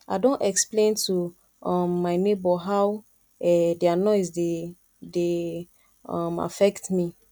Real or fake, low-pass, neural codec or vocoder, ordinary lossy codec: real; 19.8 kHz; none; none